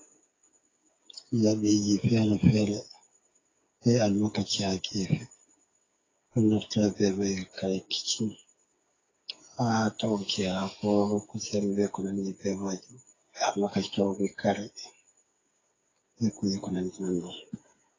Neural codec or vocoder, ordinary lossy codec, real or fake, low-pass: codec, 16 kHz, 8 kbps, FreqCodec, smaller model; AAC, 32 kbps; fake; 7.2 kHz